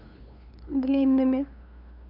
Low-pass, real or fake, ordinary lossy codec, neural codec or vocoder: 5.4 kHz; fake; none; codec, 16 kHz, 2 kbps, FunCodec, trained on LibriTTS, 25 frames a second